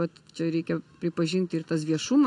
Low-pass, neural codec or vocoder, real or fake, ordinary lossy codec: 10.8 kHz; none; real; AAC, 48 kbps